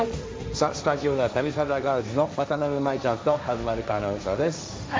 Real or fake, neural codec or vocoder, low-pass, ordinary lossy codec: fake; codec, 16 kHz, 1.1 kbps, Voila-Tokenizer; none; none